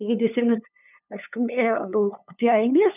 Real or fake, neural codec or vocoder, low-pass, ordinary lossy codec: fake; codec, 16 kHz, 2 kbps, FunCodec, trained on LibriTTS, 25 frames a second; 3.6 kHz; none